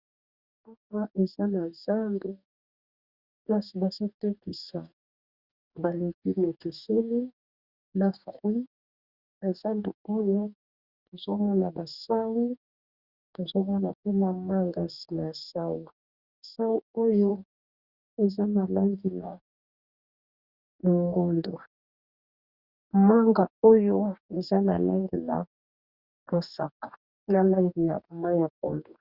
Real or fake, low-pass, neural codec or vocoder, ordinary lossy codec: fake; 5.4 kHz; codec, 44.1 kHz, 2.6 kbps, DAC; Opus, 64 kbps